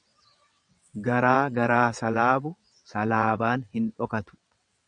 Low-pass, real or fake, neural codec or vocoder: 9.9 kHz; fake; vocoder, 22.05 kHz, 80 mel bands, WaveNeXt